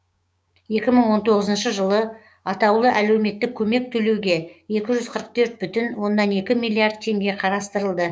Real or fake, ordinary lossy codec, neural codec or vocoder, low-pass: fake; none; codec, 16 kHz, 6 kbps, DAC; none